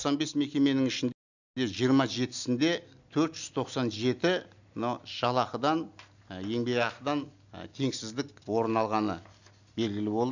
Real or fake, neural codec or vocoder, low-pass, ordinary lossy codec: real; none; 7.2 kHz; none